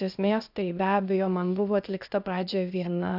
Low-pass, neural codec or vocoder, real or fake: 5.4 kHz; codec, 16 kHz, 0.8 kbps, ZipCodec; fake